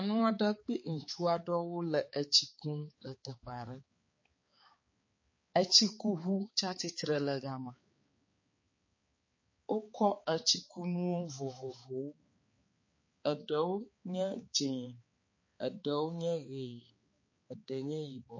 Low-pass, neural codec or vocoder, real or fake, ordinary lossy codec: 7.2 kHz; codec, 16 kHz, 4 kbps, X-Codec, HuBERT features, trained on balanced general audio; fake; MP3, 32 kbps